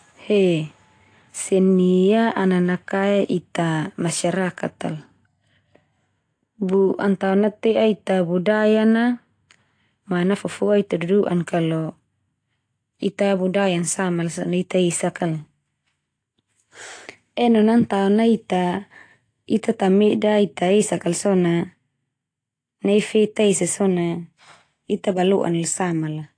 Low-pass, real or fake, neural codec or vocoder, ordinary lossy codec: 9.9 kHz; real; none; AAC, 48 kbps